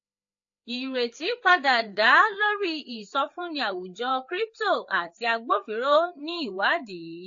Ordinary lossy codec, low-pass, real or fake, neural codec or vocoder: AAC, 48 kbps; 7.2 kHz; fake; codec, 16 kHz, 4 kbps, FreqCodec, larger model